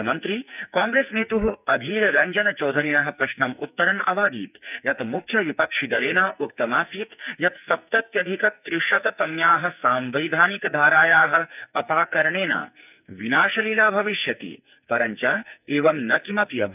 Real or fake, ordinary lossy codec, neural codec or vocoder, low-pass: fake; none; codec, 44.1 kHz, 2.6 kbps, SNAC; 3.6 kHz